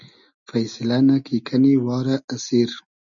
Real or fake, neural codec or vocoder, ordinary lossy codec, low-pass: real; none; MP3, 96 kbps; 7.2 kHz